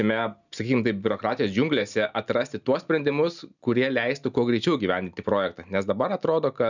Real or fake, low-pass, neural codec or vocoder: real; 7.2 kHz; none